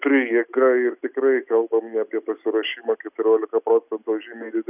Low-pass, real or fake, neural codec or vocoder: 3.6 kHz; real; none